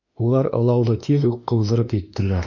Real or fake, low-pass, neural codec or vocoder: fake; 7.2 kHz; autoencoder, 48 kHz, 32 numbers a frame, DAC-VAE, trained on Japanese speech